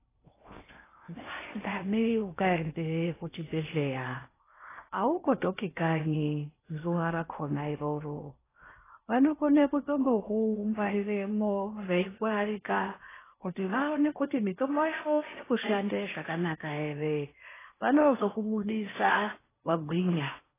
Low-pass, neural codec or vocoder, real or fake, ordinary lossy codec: 3.6 kHz; codec, 16 kHz in and 24 kHz out, 0.8 kbps, FocalCodec, streaming, 65536 codes; fake; AAC, 16 kbps